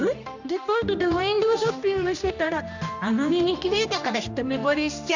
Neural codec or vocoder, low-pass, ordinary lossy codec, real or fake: codec, 16 kHz, 1 kbps, X-Codec, HuBERT features, trained on general audio; 7.2 kHz; none; fake